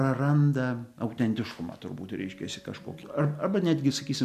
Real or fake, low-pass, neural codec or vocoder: real; 14.4 kHz; none